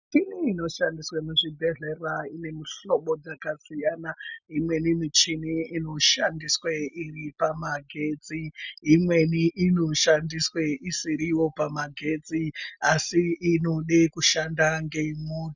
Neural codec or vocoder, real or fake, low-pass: none; real; 7.2 kHz